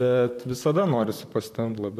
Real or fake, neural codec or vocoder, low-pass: fake; vocoder, 44.1 kHz, 128 mel bands, Pupu-Vocoder; 14.4 kHz